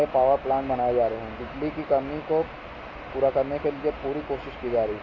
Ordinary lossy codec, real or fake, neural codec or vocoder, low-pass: MP3, 64 kbps; real; none; 7.2 kHz